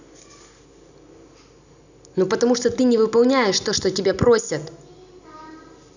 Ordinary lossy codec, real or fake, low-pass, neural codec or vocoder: none; real; 7.2 kHz; none